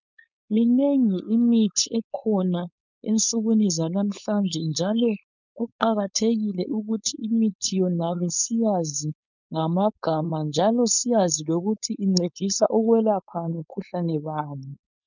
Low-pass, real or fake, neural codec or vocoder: 7.2 kHz; fake; codec, 16 kHz, 4.8 kbps, FACodec